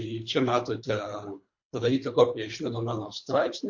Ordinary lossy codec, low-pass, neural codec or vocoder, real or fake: MP3, 48 kbps; 7.2 kHz; codec, 24 kHz, 3 kbps, HILCodec; fake